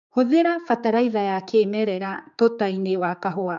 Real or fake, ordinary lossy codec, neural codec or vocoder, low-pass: fake; none; codec, 16 kHz, 4 kbps, X-Codec, HuBERT features, trained on general audio; 7.2 kHz